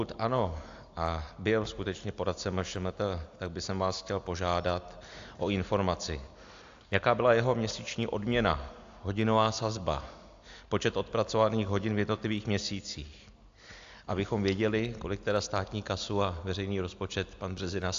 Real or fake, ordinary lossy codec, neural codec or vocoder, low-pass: real; AAC, 64 kbps; none; 7.2 kHz